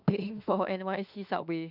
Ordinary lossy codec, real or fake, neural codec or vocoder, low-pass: none; fake; autoencoder, 48 kHz, 32 numbers a frame, DAC-VAE, trained on Japanese speech; 5.4 kHz